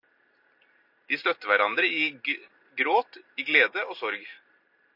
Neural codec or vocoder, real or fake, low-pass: none; real; 5.4 kHz